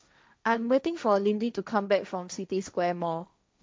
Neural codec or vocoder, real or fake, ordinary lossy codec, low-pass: codec, 16 kHz, 1.1 kbps, Voila-Tokenizer; fake; none; none